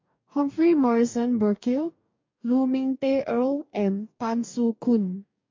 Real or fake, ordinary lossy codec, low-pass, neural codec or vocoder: fake; MP3, 48 kbps; 7.2 kHz; codec, 44.1 kHz, 2.6 kbps, DAC